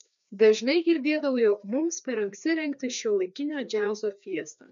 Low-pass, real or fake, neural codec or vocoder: 7.2 kHz; fake; codec, 16 kHz, 2 kbps, FreqCodec, larger model